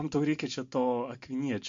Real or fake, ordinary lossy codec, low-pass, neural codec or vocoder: real; MP3, 48 kbps; 7.2 kHz; none